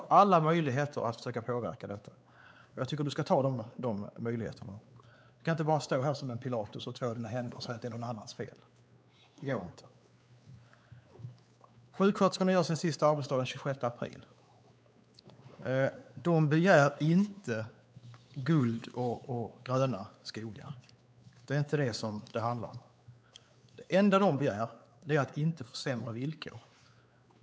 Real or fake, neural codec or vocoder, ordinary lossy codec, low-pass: fake; codec, 16 kHz, 4 kbps, X-Codec, WavLM features, trained on Multilingual LibriSpeech; none; none